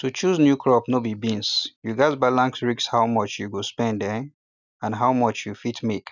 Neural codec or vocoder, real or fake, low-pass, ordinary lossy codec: none; real; 7.2 kHz; none